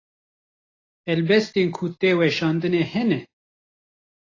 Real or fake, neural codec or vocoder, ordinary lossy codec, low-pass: real; none; AAC, 32 kbps; 7.2 kHz